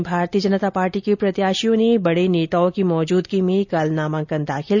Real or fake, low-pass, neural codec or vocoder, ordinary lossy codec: real; 7.2 kHz; none; none